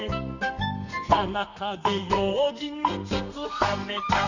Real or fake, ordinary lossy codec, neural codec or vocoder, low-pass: fake; none; codec, 32 kHz, 1.9 kbps, SNAC; 7.2 kHz